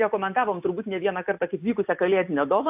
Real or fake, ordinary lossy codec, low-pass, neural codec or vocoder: real; AAC, 32 kbps; 3.6 kHz; none